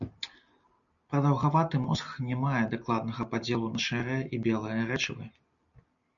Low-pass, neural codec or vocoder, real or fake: 7.2 kHz; none; real